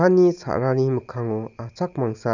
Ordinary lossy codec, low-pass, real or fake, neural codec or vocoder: none; 7.2 kHz; real; none